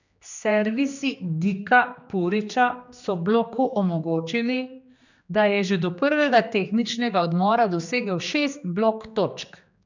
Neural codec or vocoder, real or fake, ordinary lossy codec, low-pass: codec, 16 kHz, 2 kbps, X-Codec, HuBERT features, trained on general audio; fake; none; 7.2 kHz